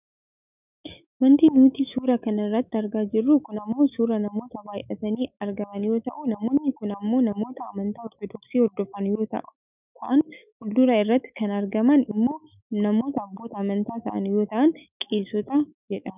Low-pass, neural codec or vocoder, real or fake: 3.6 kHz; autoencoder, 48 kHz, 128 numbers a frame, DAC-VAE, trained on Japanese speech; fake